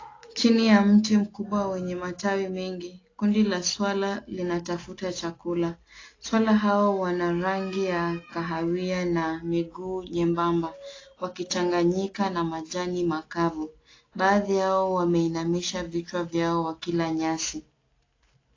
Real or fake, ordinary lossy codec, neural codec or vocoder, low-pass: real; AAC, 32 kbps; none; 7.2 kHz